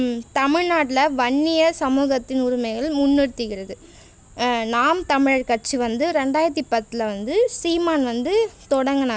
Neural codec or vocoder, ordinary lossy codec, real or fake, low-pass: none; none; real; none